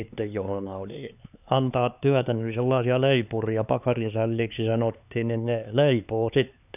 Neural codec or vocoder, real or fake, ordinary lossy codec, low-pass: codec, 16 kHz, 4 kbps, X-Codec, HuBERT features, trained on LibriSpeech; fake; none; 3.6 kHz